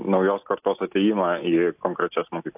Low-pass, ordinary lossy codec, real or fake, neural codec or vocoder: 3.6 kHz; AAC, 32 kbps; real; none